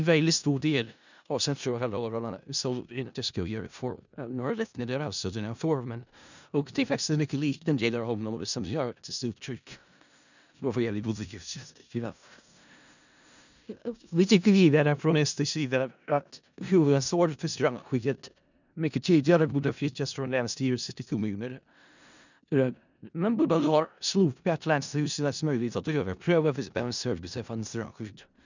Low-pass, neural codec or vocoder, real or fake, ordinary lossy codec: 7.2 kHz; codec, 16 kHz in and 24 kHz out, 0.4 kbps, LongCat-Audio-Codec, four codebook decoder; fake; none